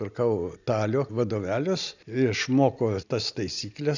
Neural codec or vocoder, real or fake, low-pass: none; real; 7.2 kHz